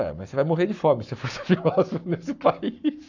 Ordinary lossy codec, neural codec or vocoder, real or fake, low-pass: none; codec, 44.1 kHz, 7.8 kbps, Pupu-Codec; fake; 7.2 kHz